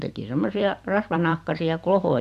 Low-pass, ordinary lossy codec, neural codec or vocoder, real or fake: 14.4 kHz; none; vocoder, 44.1 kHz, 128 mel bands every 256 samples, BigVGAN v2; fake